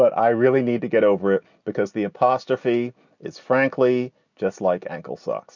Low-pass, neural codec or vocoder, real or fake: 7.2 kHz; none; real